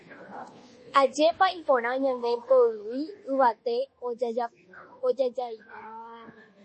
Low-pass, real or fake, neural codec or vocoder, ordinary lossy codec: 9.9 kHz; fake; codec, 24 kHz, 1.2 kbps, DualCodec; MP3, 32 kbps